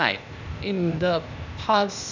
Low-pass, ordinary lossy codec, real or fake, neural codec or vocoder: 7.2 kHz; none; fake; codec, 16 kHz, 0.8 kbps, ZipCodec